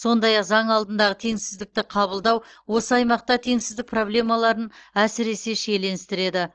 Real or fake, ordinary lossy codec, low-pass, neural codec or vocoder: real; Opus, 16 kbps; 7.2 kHz; none